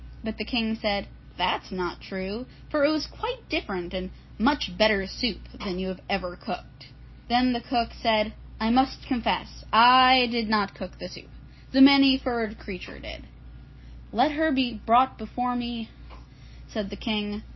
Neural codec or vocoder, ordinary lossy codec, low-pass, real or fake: none; MP3, 24 kbps; 7.2 kHz; real